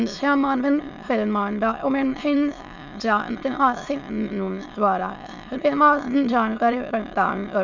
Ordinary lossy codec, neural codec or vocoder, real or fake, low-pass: none; autoencoder, 22.05 kHz, a latent of 192 numbers a frame, VITS, trained on many speakers; fake; 7.2 kHz